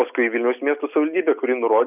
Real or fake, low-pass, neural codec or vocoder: real; 3.6 kHz; none